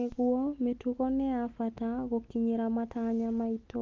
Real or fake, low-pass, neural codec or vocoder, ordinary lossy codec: real; 7.2 kHz; none; Opus, 32 kbps